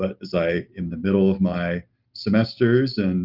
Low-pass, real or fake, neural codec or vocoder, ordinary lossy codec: 5.4 kHz; real; none; Opus, 24 kbps